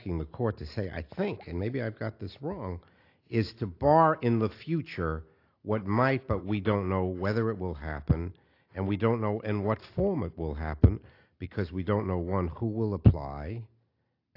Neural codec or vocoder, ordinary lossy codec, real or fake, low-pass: none; AAC, 32 kbps; real; 5.4 kHz